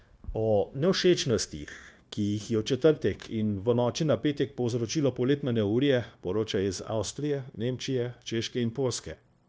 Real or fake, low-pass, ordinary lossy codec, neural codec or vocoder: fake; none; none; codec, 16 kHz, 0.9 kbps, LongCat-Audio-Codec